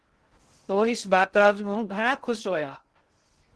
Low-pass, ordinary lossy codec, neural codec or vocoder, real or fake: 10.8 kHz; Opus, 16 kbps; codec, 16 kHz in and 24 kHz out, 0.6 kbps, FocalCodec, streaming, 2048 codes; fake